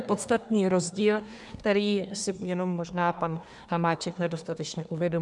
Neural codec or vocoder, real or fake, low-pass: codec, 24 kHz, 1 kbps, SNAC; fake; 10.8 kHz